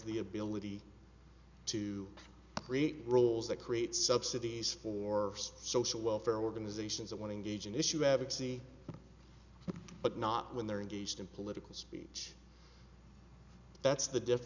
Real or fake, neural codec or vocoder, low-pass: real; none; 7.2 kHz